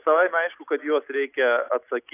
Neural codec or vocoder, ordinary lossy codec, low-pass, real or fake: none; AAC, 24 kbps; 3.6 kHz; real